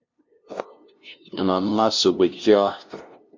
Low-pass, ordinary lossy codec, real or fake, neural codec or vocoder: 7.2 kHz; MP3, 48 kbps; fake; codec, 16 kHz, 0.5 kbps, FunCodec, trained on LibriTTS, 25 frames a second